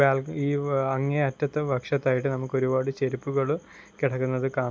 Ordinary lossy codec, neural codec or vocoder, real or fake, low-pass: none; none; real; none